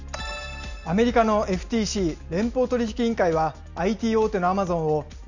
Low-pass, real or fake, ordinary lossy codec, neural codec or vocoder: 7.2 kHz; real; none; none